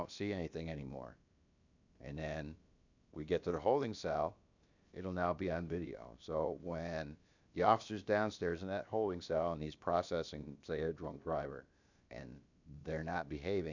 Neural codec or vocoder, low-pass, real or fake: codec, 16 kHz, about 1 kbps, DyCAST, with the encoder's durations; 7.2 kHz; fake